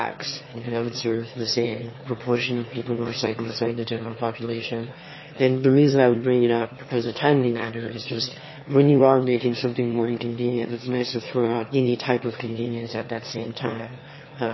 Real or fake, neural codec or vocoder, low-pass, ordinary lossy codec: fake; autoencoder, 22.05 kHz, a latent of 192 numbers a frame, VITS, trained on one speaker; 7.2 kHz; MP3, 24 kbps